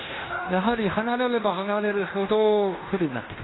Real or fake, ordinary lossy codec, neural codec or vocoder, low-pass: fake; AAC, 16 kbps; codec, 16 kHz in and 24 kHz out, 0.9 kbps, LongCat-Audio-Codec, fine tuned four codebook decoder; 7.2 kHz